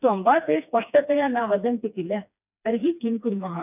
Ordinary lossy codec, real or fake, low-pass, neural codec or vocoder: none; fake; 3.6 kHz; codec, 16 kHz, 2 kbps, FreqCodec, smaller model